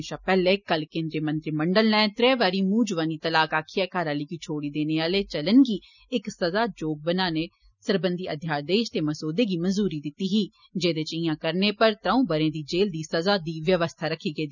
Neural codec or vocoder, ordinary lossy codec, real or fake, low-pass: none; none; real; 7.2 kHz